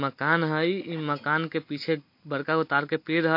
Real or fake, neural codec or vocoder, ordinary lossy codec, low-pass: real; none; MP3, 32 kbps; 5.4 kHz